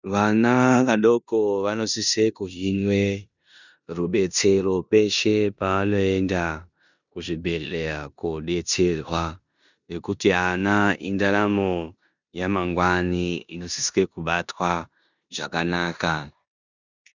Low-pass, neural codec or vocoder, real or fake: 7.2 kHz; codec, 16 kHz in and 24 kHz out, 0.9 kbps, LongCat-Audio-Codec, four codebook decoder; fake